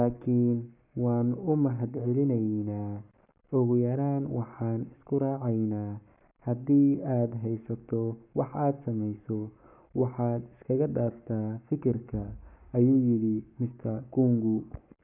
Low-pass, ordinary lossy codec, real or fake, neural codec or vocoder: 3.6 kHz; none; fake; codec, 44.1 kHz, 7.8 kbps, Pupu-Codec